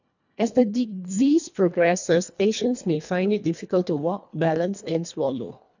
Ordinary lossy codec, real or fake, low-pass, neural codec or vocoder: none; fake; 7.2 kHz; codec, 24 kHz, 1.5 kbps, HILCodec